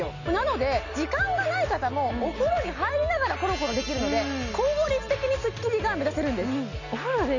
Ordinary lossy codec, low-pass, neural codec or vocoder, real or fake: none; 7.2 kHz; none; real